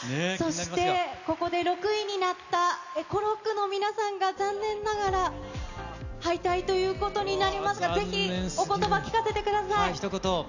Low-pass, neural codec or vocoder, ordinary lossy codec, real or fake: 7.2 kHz; none; none; real